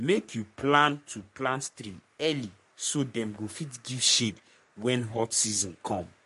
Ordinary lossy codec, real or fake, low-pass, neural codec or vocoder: MP3, 48 kbps; fake; 14.4 kHz; codec, 44.1 kHz, 3.4 kbps, Pupu-Codec